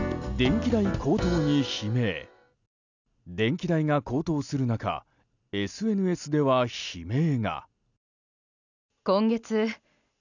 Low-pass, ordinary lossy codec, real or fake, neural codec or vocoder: 7.2 kHz; none; real; none